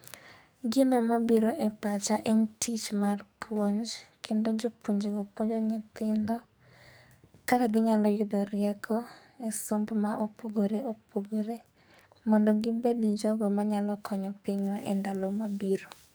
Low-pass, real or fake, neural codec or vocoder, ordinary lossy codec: none; fake; codec, 44.1 kHz, 2.6 kbps, SNAC; none